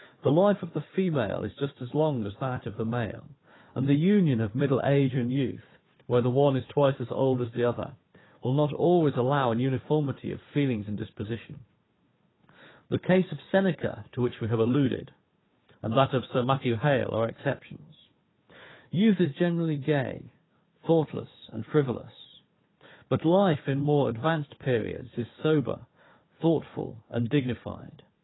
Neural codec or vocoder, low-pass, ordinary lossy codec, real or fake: codec, 16 kHz, 4 kbps, FunCodec, trained on Chinese and English, 50 frames a second; 7.2 kHz; AAC, 16 kbps; fake